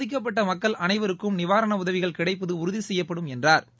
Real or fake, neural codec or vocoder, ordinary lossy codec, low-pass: real; none; none; none